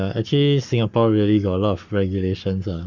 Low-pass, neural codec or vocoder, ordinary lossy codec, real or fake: 7.2 kHz; codec, 44.1 kHz, 7.8 kbps, Pupu-Codec; none; fake